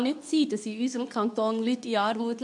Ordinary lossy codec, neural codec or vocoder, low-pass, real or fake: none; codec, 24 kHz, 0.9 kbps, WavTokenizer, medium speech release version 2; 10.8 kHz; fake